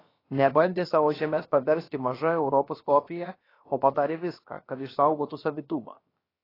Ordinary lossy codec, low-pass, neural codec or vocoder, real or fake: AAC, 24 kbps; 5.4 kHz; codec, 16 kHz, about 1 kbps, DyCAST, with the encoder's durations; fake